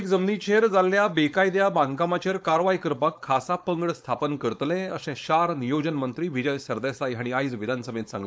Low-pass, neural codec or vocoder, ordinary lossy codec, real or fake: none; codec, 16 kHz, 4.8 kbps, FACodec; none; fake